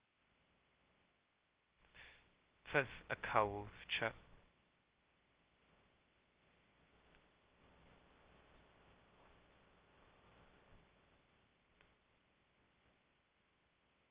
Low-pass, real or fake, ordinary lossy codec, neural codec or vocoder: 3.6 kHz; fake; Opus, 16 kbps; codec, 16 kHz, 0.2 kbps, FocalCodec